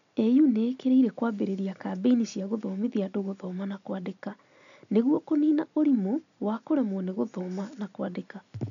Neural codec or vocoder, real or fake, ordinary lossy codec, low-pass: none; real; none; 7.2 kHz